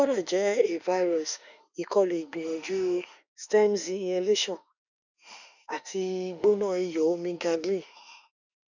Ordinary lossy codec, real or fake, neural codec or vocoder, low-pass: none; fake; autoencoder, 48 kHz, 32 numbers a frame, DAC-VAE, trained on Japanese speech; 7.2 kHz